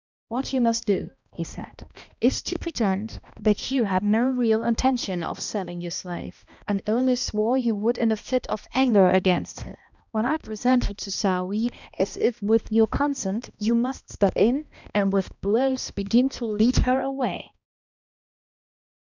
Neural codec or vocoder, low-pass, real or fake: codec, 16 kHz, 1 kbps, X-Codec, HuBERT features, trained on balanced general audio; 7.2 kHz; fake